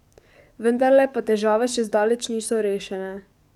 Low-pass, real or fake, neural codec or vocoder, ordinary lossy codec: 19.8 kHz; fake; codec, 44.1 kHz, 7.8 kbps, DAC; none